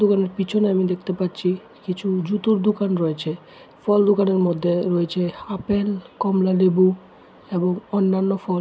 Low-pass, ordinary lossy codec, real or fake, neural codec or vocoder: none; none; real; none